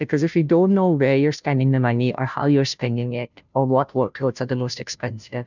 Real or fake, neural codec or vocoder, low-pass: fake; codec, 16 kHz, 0.5 kbps, FunCodec, trained on Chinese and English, 25 frames a second; 7.2 kHz